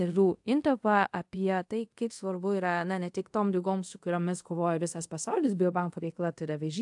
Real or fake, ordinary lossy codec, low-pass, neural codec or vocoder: fake; AAC, 64 kbps; 10.8 kHz; codec, 24 kHz, 0.5 kbps, DualCodec